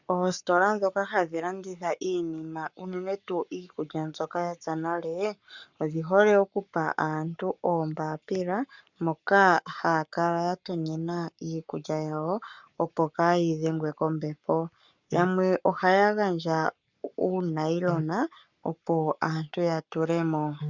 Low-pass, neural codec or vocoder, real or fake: 7.2 kHz; codec, 44.1 kHz, 7.8 kbps, Pupu-Codec; fake